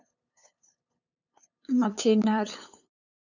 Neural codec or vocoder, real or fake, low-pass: codec, 16 kHz, 8 kbps, FunCodec, trained on LibriTTS, 25 frames a second; fake; 7.2 kHz